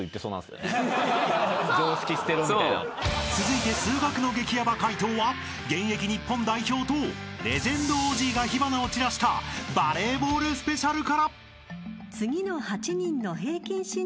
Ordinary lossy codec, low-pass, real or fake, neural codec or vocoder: none; none; real; none